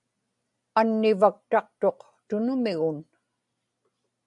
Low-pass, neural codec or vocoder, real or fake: 10.8 kHz; none; real